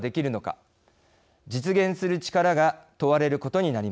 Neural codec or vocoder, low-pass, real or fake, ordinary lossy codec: none; none; real; none